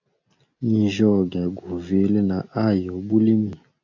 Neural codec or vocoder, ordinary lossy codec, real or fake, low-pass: none; AAC, 48 kbps; real; 7.2 kHz